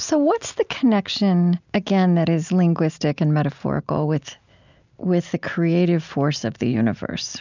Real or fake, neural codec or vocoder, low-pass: real; none; 7.2 kHz